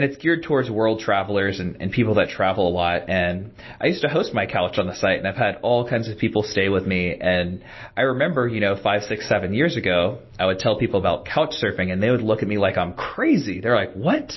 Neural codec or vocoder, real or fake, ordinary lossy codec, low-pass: none; real; MP3, 24 kbps; 7.2 kHz